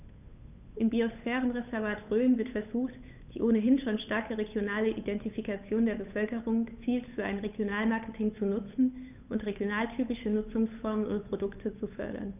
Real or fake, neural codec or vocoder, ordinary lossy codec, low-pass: fake; codec, 16 kHz, 8 kbps, FunCodec, trained on Chinese and English, 25 frames a second; none; 3.6 kHz